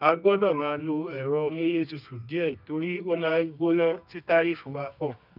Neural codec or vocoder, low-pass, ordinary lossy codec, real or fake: codec, 24 kHz, 0.9 kbps, WavTokenizer, medium music audio release; 5.4 kHz; none; fake